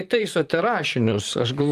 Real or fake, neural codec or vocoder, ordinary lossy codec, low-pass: fake; vocoder, 44.1 kHz, 128 mel bands, Pupu-Vocoder; Opus, 32 kbps; 14.4 kHz